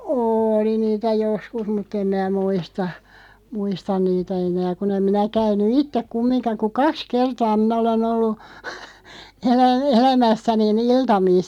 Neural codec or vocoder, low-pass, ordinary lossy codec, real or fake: none; 19.8 kHz; none; real